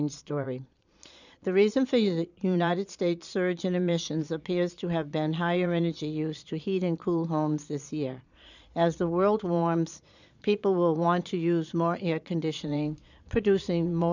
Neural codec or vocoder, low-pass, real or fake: vocoder, 22.05 kHz, 80 mel bands, Vocos; 7.2 kHz; fake